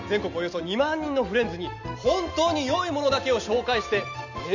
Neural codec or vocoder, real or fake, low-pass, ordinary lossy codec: none; real; 7.2 kHz; none